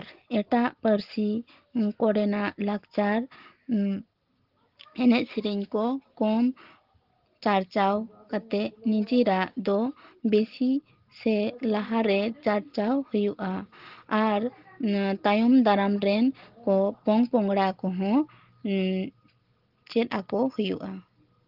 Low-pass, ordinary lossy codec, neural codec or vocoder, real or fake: 5.4 kHz; Opus, 16 kbps; none; real